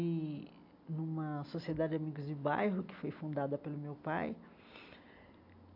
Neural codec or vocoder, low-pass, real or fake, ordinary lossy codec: none; 5.4 kHz; real; AAC, 48 kbps